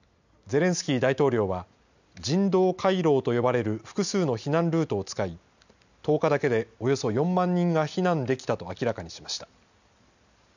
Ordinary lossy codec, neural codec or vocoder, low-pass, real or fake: none; none; 7.2 kHz; real